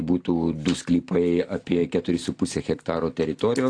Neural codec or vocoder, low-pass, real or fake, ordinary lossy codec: none; 9.9 kHz; real; AAC, 48 kbps